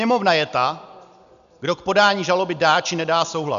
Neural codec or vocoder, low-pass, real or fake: none; 7.2 kHz; real